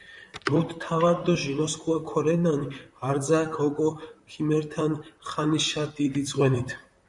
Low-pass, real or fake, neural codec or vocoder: 10.8 kHz; fake; vocoder, 44.1 kHz, 128 mel bands, Pupu-Vocoder